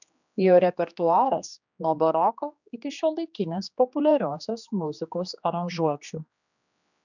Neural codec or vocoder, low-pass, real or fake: codec, 16 kHz, 2 kbps, X-Codec, HuBERT features, trained on general audio; 7.2 kHz; fake